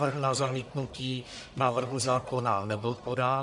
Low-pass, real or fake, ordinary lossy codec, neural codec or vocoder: 10.8 kHz; fake; MP3, 96 kbps; codec, 44.1 kHz, 1.7 kbps, Pupu-Codec